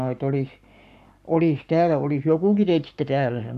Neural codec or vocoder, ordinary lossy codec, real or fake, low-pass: codec, 44.1 kHz, 7.8 kbps, Pupu-Codec; none; fake; 14.4 kHz